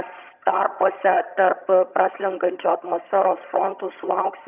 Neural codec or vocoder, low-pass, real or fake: vocoder, 22.05 kHz, 80 mel bands, HiFi-GAN; 3.6 kHz; fake